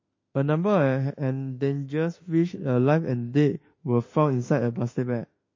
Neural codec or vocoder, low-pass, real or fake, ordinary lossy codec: autoencoder, 48 kHz, 32 numbers a frame, DAC-VAE, trained on Japanese speech; 7.2 kHz; fake; MP3, 32 kbps